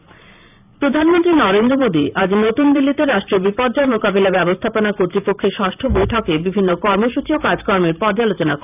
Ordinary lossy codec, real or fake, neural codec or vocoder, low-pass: none; fake; vocoder, 44.1 kHz, 128 mel bands every 512 samples, BigVGAN v2; 3.6 kHz